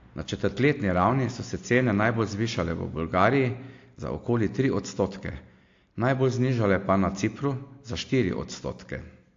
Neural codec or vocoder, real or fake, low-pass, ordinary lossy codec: none; real; 7.2 kHz; AAC, 48 kbps